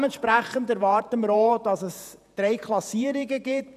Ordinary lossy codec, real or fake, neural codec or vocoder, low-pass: none; fake; vocoder, 48 kHz, 128 mel bands, Vocos; 14.4 kHz